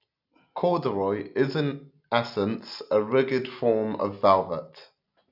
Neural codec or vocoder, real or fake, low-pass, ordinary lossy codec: none; real; 5.4 kHz; none